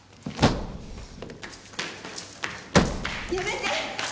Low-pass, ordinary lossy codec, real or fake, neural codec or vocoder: none; none; real; none